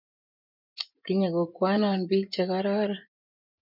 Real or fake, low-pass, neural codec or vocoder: fake; 5.4 kHz; vocoder, 24 kHz, 100 mel bands, Vocos